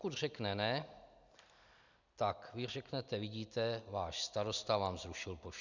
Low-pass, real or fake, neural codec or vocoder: 7.2 kHz; real; none